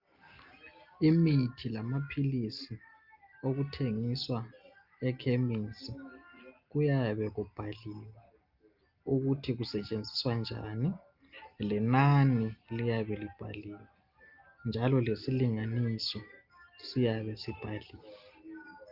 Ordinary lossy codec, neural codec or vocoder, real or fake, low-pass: Opus, 24 kbps; none; real; 5.4 kHz